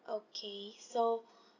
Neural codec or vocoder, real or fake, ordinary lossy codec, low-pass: none; real; none; 7.2 kHz